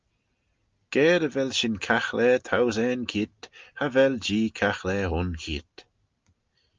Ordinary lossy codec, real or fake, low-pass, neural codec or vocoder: Opus, 24 kbps; real; 7.2 kHz; none